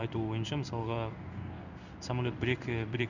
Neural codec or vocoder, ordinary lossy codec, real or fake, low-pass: codec, 16 kHz in and 24 kHz out, 1 kbps, XY-Tokenizer; none; fake; 7.2 kHz